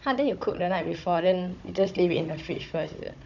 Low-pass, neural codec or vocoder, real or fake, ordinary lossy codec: 7.2 kHz; codec, 16 kHz, 16 kbps, FunCodec, trained on Chinese and English, 50 frames a second; fake; none